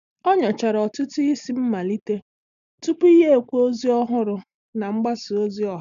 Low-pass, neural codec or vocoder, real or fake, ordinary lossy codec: 7.2 kHz; none; real; none